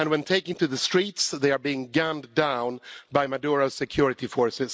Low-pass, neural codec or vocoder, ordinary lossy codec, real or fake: none; none; none; real